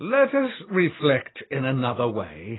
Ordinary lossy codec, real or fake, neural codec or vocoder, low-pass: AAC, 16 kbps; real; none; 7.2 kHz